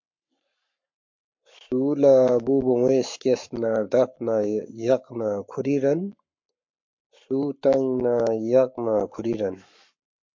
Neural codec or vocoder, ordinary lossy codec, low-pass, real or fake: codec, 44.1 kHz, 7.8 kbps, Pupu-Codec; MP3, 48 kbps; 7.2 kHz; fake